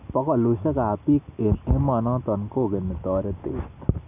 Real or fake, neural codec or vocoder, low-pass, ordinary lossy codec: real; none; 3.6 kHz; none